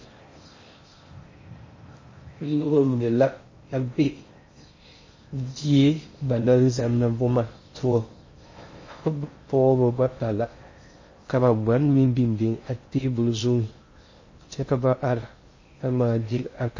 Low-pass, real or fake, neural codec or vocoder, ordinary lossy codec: 7.2 kHz; fake; codec, 16 kHz in and 24 kHz out, 0.6 kbps, FocalCodec, streaming, 4096 codes; MP3, 32 kbps